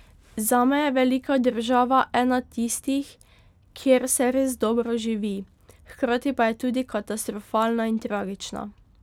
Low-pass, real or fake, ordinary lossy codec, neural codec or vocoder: 19.8 kHz; real; none; none